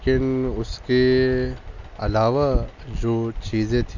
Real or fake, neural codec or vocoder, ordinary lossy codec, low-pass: real; none; none; 7.2 kHz